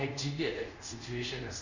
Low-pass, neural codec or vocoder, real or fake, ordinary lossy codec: 7.2 kHz; codec, 24 kHz, 0.5 kbps, DualCodec; fake; none